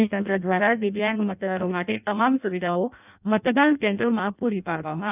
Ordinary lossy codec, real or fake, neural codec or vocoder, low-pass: none; fake; codec, 16 kHz in and 24 kHz out, 0.6 kbps, FireRedTTS-2 codec; 3.6 kHz